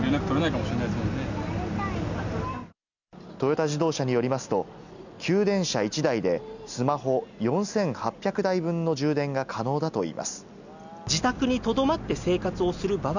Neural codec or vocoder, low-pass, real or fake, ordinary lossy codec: none; 7.2 kHz; real; none